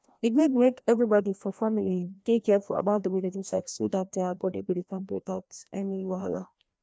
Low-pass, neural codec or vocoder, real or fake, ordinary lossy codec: none; codec, 16 kHz, 1 kbps, FreqCodec, larger model; fake; none